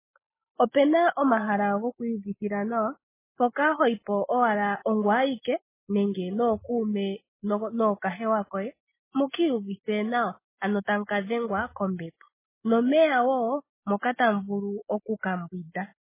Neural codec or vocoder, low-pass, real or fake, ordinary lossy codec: none; 3.6 kHz; real; MP3, 16 kbps